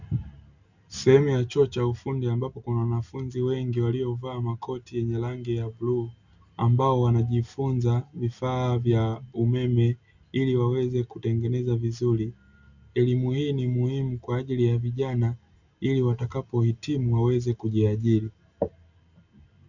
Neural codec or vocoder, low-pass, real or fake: none; 7.2 kHz; real